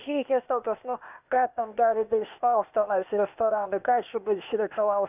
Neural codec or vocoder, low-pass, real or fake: codec, 16 kHz, 0.8 kbps, ZipCodec; 3.6 kHz; fake